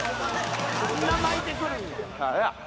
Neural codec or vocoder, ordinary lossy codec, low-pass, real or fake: none; none; none; real